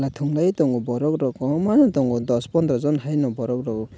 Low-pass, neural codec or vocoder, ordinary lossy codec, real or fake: none; none; none; real